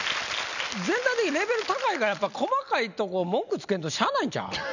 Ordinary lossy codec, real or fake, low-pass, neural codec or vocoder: none; real; 7.2 kHz; none